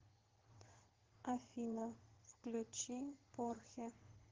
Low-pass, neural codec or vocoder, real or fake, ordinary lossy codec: 7.2 kHz; vocoder, 22.05 kHz, 80 mel bands, WaveNeXt; fake; Opus, 16 kbps